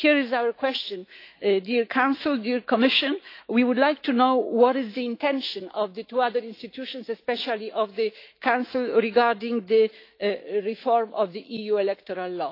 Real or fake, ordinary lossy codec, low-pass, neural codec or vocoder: fake; AAC, 32 kbps; 5.4 kHz; autoencoder, 48 kHz, 128 numbers a frame, DAC-VAE, trained on Japanese speech